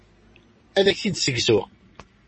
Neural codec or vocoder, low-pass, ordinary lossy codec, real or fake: none; 10.8 kHz; MP3, 32 kbps; real